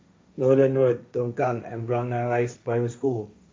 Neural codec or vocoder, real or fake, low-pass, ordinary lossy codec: codec, 16 kHz, 1.1 kbps, Voila-Tokenizer; fake; none; none